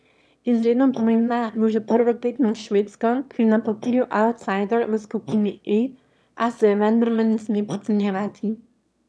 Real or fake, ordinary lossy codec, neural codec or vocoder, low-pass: fake; none; autoencoder, 22.05 kHz, a latent of 192 numbers a frame, VITS, trained on one speaker; none